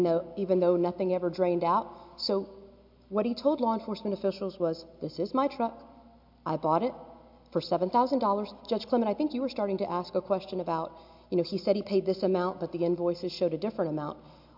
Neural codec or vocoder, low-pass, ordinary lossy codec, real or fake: none; 5.4 kHz; MP3, 48 kbps; real